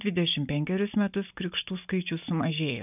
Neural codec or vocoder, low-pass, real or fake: none; 3.6 kHz; real